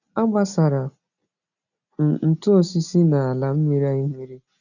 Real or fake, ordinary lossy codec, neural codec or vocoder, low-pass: real; none; none; 7.2 kHz